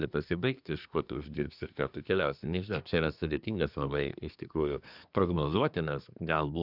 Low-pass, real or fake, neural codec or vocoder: 5.4 kHz; fake; codec, 24 kHz, 1 kbps, SNAC